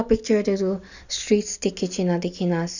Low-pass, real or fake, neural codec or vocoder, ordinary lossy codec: 7.2 kHz; real; none; none